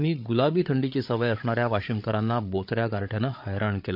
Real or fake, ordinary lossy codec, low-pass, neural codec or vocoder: fake; none; 5.4 kHz; codec, 16 kHz, 8 kbps, FreqCodec, larger model